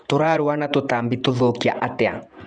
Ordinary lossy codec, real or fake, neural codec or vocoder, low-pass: none; fake; vocoder, 48 kHz, 128 mel bands, Vocos; 9.9 kHz